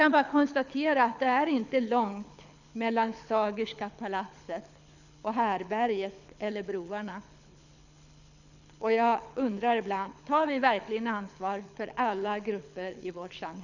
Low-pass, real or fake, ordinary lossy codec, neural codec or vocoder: 7.2 kHz; fake; none; codec, 24 kHz, 6 kbps, HILCodec